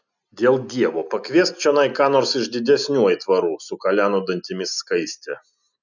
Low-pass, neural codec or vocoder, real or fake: 7.2 kHz; none; real